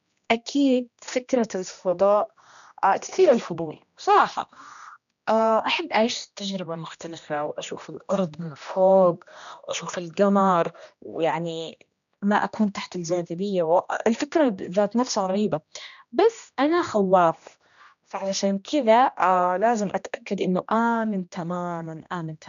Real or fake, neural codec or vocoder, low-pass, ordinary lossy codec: fake; codec, 16 kHz, 1 kbps, X-Codec, HuBERT features, trained on general audio; 7.2 kHz; none